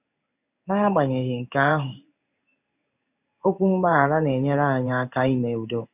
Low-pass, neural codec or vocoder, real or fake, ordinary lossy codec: 3.6 kHz; codec, 16 kHz in and 24 kHz out, 1 kbps, XY-Tokenizer; fake; none